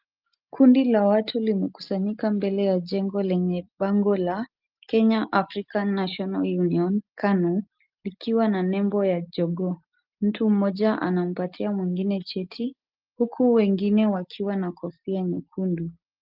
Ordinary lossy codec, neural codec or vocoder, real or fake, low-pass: Opus, 24 kbps; none; real; 5.4 kHz